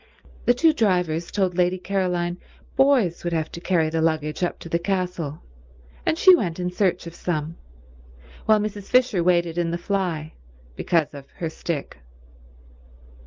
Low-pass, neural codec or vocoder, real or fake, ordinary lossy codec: 7.2 kHz; none; real; Opus, 32 kbps